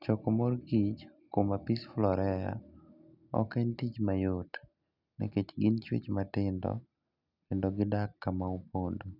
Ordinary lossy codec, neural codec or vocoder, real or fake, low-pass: none; none; real; 5.4 kHz